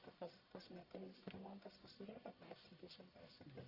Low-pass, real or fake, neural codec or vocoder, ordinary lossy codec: 5.4 kHz; fake; codec, 44.1 kHz, 1.7 kbps, Pupu-Codec; Opus, 64 kbps